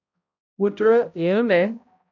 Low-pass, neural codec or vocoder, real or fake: 7.2 kHz; codec, 16 kHz, 0.5 kbps, X-Codec, HuBERT features, trained on balanced general audio; fake